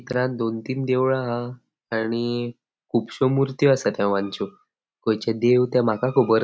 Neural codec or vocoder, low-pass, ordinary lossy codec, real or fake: none; none; none; real